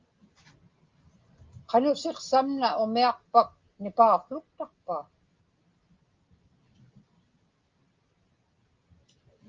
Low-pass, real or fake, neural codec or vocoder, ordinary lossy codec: 7.2 kHz; real; none; Opus, 24 kbps